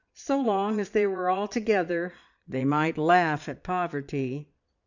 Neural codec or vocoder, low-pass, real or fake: vocoder, 22.05 kHz, 80 mel bands, Vocos; 7.2 kHz; fake